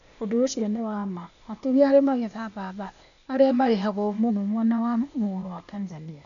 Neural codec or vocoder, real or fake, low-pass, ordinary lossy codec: codec, 16 kHz, 0.8 kbps, ZipCodec; fake; 7.2 kHz; none